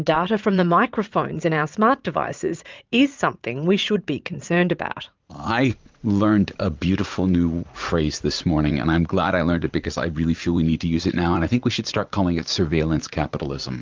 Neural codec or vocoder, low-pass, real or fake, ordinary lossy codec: vocoder, 44.1 kHz, 80 mel bands, Vocos; 7.2 kHz; fake; Opus, 24 kbps